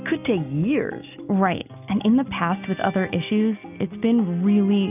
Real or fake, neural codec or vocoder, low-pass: real; none; 3.6 kHz